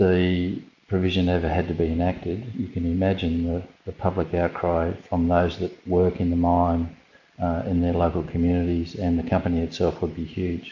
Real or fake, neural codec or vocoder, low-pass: real; none; 7.2 kHz